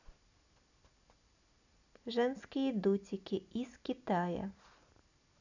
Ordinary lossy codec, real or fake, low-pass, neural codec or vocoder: none; real; 7.2 kHz; none